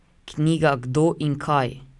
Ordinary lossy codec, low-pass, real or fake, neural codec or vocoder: none; 10.8 kHz; real; none